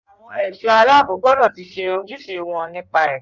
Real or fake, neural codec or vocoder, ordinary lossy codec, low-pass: fake; codec, 16 kHz in and 24 kHz out, 1.1 kbps, FireRedTTS-2 codec; none; 7.2 kHz